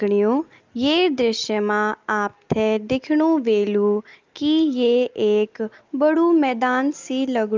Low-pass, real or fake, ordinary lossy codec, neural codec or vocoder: 7.2 kHz; real; Opus, 32 kbps; none